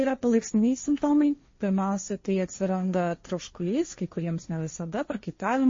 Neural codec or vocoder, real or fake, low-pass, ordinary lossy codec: codec, 16 kHz, 1.1 kbps, Voila-Tokenizer; fake; 7.2 kHz; MP3, 32 kbps